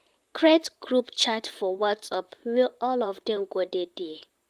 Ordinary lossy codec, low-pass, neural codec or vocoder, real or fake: Opus, 32 kbps; 14.4 kHz; vocoder, 44.1 kHz, 128 mel bands, Pupu-Vocoder; fake